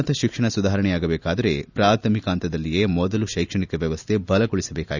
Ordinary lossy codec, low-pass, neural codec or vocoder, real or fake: none; 7.2 kHz; none; real